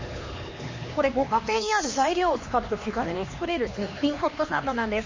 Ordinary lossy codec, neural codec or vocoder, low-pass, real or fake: MP3, 32 kbps; codec, 16 kHz, 2 kbps, X-Codec, HuBERT features, trained on LibriSpeech; 7.2 kHz; fake